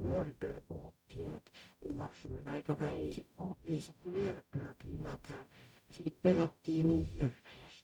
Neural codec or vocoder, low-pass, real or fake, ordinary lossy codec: codec, 44.1 kHz, 0.9 kbps, DAC; 19.8 kHz; fake; none